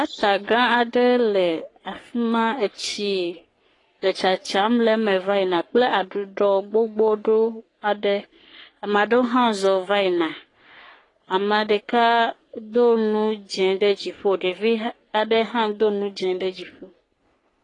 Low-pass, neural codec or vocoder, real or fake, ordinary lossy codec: 10.8 kHz; codec, 44.1 kHz, 3.4 kbps, Pupu-Codec; fake; AAC, 32 kbps